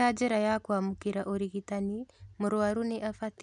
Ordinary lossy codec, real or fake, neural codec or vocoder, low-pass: none; real; none; 10.8 kHz